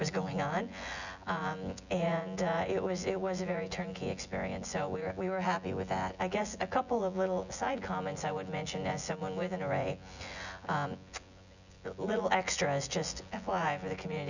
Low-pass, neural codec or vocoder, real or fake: 7.2 kHz; vocoder, 24 kHz, 100 mel bands, Vocos; fake